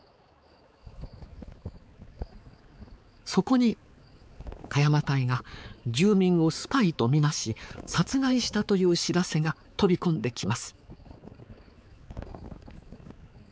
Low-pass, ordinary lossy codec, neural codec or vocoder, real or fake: none; none; codec, 16 kHz, 4 kbps, X-Codec, HuBERT features, trained on balanced general audio; fake